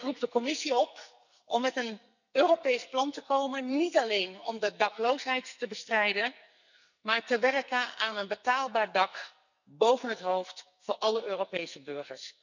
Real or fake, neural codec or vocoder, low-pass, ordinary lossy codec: fake; codec, 44.1 kHz, 2.6 kbps, SNAC; 7.2 kHz; none